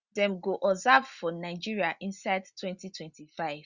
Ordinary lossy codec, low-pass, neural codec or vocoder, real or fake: Opus, 64 kbps; 7.2 kHz; none; real